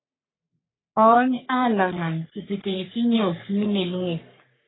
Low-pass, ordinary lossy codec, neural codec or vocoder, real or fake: 7.2 kHz; AAC, 16 kbps; codec, 44.1 kHz, 3.4 kbps, Pupu-Codec; fake